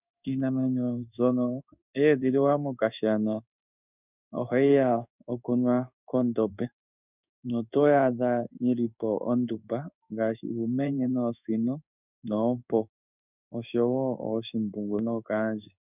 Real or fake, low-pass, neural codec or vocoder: fake; 3.6 kHz; codec, 16 kHz in and 24 kHz out, 1 kbps, XY-Tokenizer